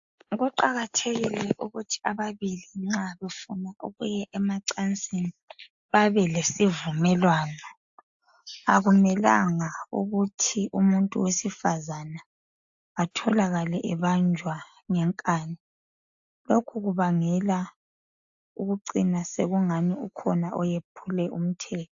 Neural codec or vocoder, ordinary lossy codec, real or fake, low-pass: none; AAC, 64 kbps; real; 7.2 kHz